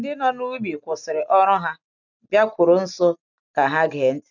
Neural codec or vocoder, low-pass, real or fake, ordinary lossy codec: none; 7.2 kHz; real; none